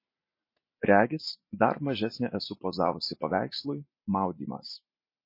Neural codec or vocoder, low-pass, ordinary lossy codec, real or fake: none; 5.4 kHz; MP3, 24 kbps; real